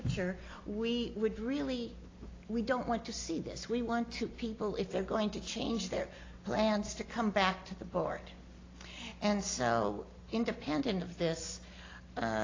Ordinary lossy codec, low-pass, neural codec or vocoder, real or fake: AAC, 32 kbps; 7.2 kHz; none; real